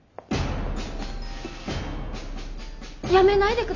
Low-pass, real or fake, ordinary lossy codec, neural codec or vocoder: 7.2 kHz; real; none; none